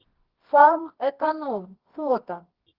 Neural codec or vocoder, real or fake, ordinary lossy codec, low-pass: codec, 24 kHz, 0.9 kbps, WavTokenizer, medium music audio release; fake; Opus, 16 kbps; 5.4 kHz